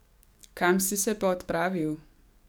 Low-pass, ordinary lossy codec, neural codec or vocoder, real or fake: none; none; codec, 44.1 kHz, 7.8 kbps, DAC; fake